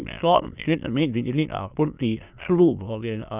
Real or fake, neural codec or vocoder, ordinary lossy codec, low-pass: fake; autoencoder, 22.05 kHz, a latent of 192 numbers a frame, VITS, trained on many speakers; none; 3.6 kHz